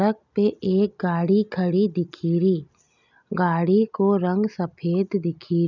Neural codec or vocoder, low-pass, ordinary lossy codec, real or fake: none; 7.2 kHz; none; real